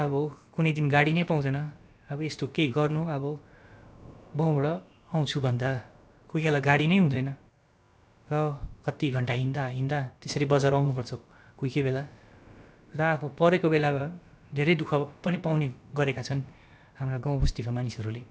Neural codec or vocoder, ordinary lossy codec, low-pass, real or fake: codec, 16 kHz, about 1 kbps, DyCAST, with the encoder's durations; none; none; fake